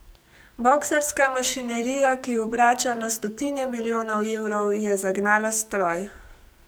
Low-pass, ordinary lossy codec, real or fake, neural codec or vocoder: none; none; fake; codec, 44.1 kHz, 2.6 kbps, SNAC